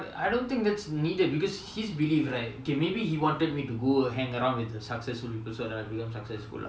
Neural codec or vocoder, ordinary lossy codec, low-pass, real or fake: none; none; none; real